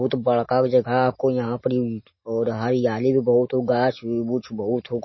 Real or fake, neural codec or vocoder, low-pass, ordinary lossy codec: real; none; 7.2 kHz; MP3, 24 kbps